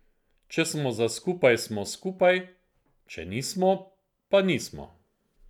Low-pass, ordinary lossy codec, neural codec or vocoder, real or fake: 19.8 kHz; none; none; real